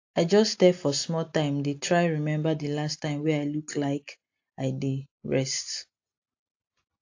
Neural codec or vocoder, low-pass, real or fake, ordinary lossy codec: none; 7.2 kHz; real; AAC, 48 kbps